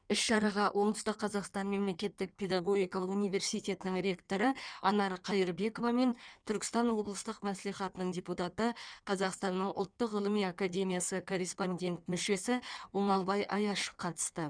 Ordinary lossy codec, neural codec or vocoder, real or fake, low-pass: none; codec, 16 kHz in and 24 kHz out, 1.1 kbps, FireRedTTS-2 codec; fake; 9.9 kHz